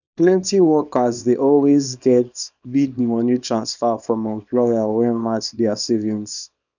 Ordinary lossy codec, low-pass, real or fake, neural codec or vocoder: none; 7.2 kHz; fake; codec, 24 kHz, 0.9 kbps, WavTokenizer, small release